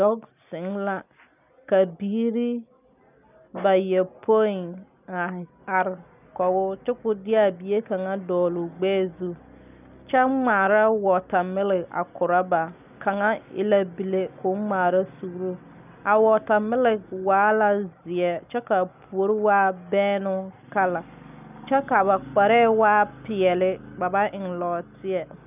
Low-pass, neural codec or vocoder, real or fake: 3.6 kHz; none; real